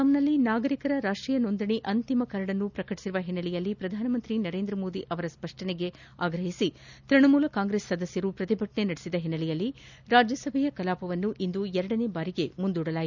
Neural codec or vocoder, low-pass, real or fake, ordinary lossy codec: none; 7.2 kHz; real; none